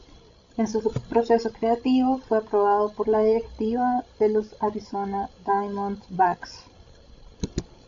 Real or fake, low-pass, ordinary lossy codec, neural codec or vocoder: fake; 7.2 kHz; AAC, 64 kbps; codec, 16 kHz, 16 kbps, FreqCodec, larger model